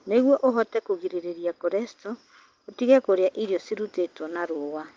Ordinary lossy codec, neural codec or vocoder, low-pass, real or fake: Opus, 24 kbps; none; 7.2 kHz; real